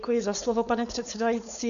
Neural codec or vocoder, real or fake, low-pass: codec, 16 kHz, 4.8 kbps, FACodec; fake; 7.2 kHz